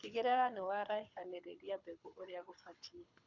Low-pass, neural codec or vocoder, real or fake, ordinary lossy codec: 7.2 kHz; codec, 24 kHz, 6 kbps, HILCodec; fake; none